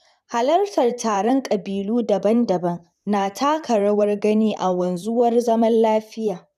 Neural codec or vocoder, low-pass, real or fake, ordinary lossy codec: vocoder, 44.1 kHz, 128 mel bands, Pupu-Vocoder; 14.4 kHz; fake; none